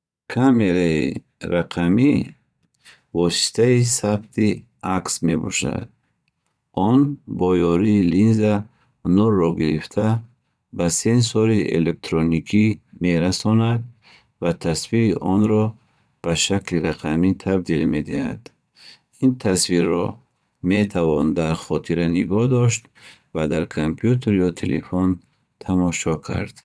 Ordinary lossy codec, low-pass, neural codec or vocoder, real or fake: none; none; vocoder, 22.05 kHz, 80 mel bands, Vocos; fake